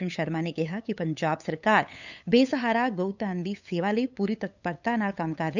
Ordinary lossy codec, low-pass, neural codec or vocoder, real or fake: none; 7.2 kHz; codec, 16 kHz, 8 kbps, FunCodec, trained on LibriTTS, 25 frames a second; fake